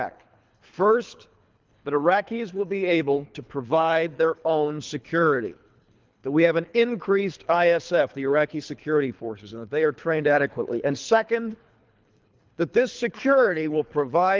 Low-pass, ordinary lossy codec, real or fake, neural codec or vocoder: 7.2 kHz; Opus, 24 kbps; fake; codec, 24 kHz, 3 kbps, HILCodec